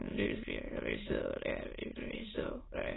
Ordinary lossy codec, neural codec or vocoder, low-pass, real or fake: AAC, 16 kbps; autoencoder, 22.05 kHz, a latent of 192 numbers a frame, VITS, trained on many speakers; 7.2 kHz; fake